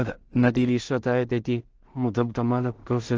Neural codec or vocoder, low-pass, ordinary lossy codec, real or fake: codec, 16 kHz in and 24 kHz out, 0.4 kbps, LongCat-Audio-Codec, two codebook decoder; 7.2 kHz; Opus, 16 kbps; fake